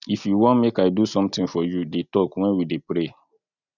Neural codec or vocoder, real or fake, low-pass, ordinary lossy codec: none; real; 7.2 kHz; none